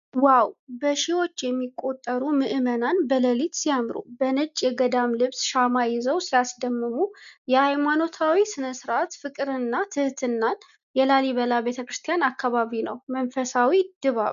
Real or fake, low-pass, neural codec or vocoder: real; 7.2 kHz; none